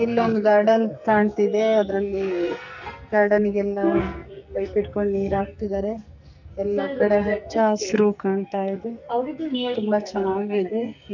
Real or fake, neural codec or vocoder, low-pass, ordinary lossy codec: fake; codec, 44.1 kHz, 2.6 kbps, SNAC; 7.2 kHz; none